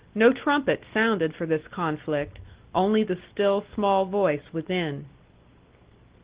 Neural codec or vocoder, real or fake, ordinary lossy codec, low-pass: none; real; Opus, 16 kbps; 3.6 kHz